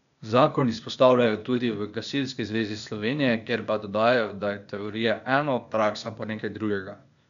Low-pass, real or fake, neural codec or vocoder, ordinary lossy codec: 7.2 kHz; fake; codec, 16 kHz, 0.8 kbps, ZipCodec; none